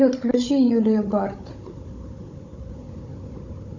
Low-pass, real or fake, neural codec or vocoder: 7.2 kHz; fake; codec, 16 kHz, 16 kbps, FunCodec, trained on Chinese and English, 50 frames a second